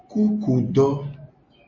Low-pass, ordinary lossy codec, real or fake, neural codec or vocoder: 7.2 kHz; MP3, 32 kbps; real; none